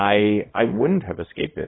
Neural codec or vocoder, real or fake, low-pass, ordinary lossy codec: codec, 24 kHz, 0.9 kbps, WavTokenizer, small release; fake; 7.2 kHz; AAC, 16 kbps